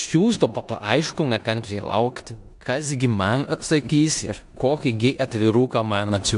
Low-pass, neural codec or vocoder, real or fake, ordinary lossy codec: 10.8 kHz; codec, 16 kHz in and 24 kHz out, 0.9 kbps, LongCat-Audio-Codec, four codebook decoder; fake; AAC, 64 kbps